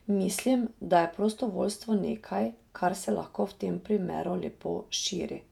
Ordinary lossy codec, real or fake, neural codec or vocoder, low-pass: none; fake; vocoder, 48 kHz, 128 mel bands, Vocos; 19.8 kHz